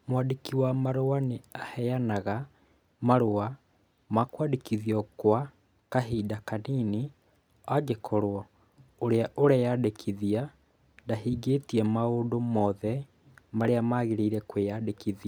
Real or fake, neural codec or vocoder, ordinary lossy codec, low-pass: real; none; none; none